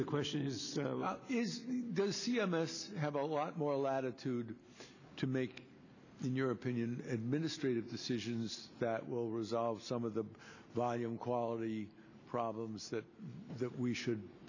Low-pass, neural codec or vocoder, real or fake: 7.2 kHz; none; real